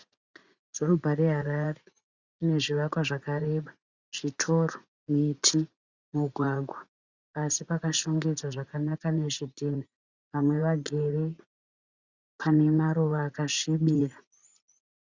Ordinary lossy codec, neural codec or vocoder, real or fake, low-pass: Opus, 64 kbps; vocoder, 44.1 kHz, 80 mel bands, Vocos; fake; 7.2 kHz